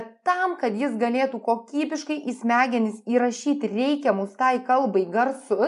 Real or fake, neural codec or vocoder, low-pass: real; none; 10.8 kHz